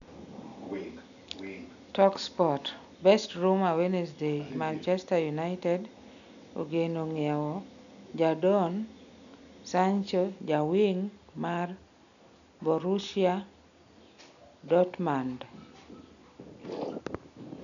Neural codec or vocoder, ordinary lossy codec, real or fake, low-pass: none; none; real; 7.2 kHz